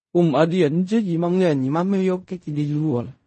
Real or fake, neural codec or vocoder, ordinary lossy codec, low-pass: fake; codec, 16 kHz in and 24 kHz out, 0.4 kbps, LongCat-Audio-Codec, fine tuned four codebook decoder; MP3, 32 kbps; 10.8 kHz